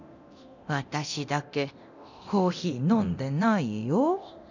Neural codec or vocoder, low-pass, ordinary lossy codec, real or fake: codec, 24 kHz, 0.9 kbps, DualCodec; 7.2 kHz; none; fake